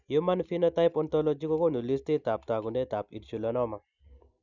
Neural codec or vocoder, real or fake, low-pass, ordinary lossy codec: none; real; 7.2 kHz; none